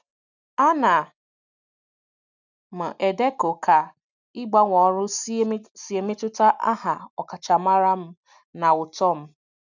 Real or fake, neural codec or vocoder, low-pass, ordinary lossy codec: real; none; 7.2 kHz; none